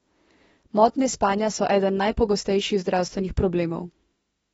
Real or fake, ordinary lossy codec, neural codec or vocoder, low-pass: fake; AAC, 24 kbps; autoencoder, 48 kHz, 32 numbers a frame, DAC-VAE, trained on Japanese speech; 19.8 kHz